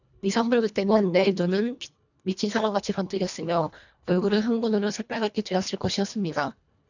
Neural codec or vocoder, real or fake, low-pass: codec, 24 kHz, 1.5 kbps, HILCodec; fake; 7.2 kHz